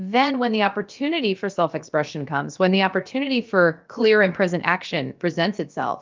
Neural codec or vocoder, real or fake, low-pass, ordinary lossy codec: codec, 16 kHz, about 1 kbps, DyCAST, with the encoder's durations; fake; 7.2 kHz; Opus, 24 kbps